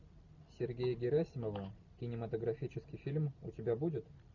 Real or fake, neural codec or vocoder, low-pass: real; none; 7.2 kHz